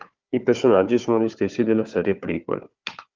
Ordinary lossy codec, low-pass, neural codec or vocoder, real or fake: Opus, 32 kbps; 7.2 kHz; codec, 16 kHz, 4 kbps, FunCodec, trained on Chinese and English, 50 frames a second; fake